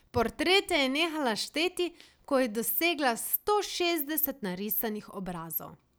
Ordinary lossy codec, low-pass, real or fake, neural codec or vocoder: none; none; real; none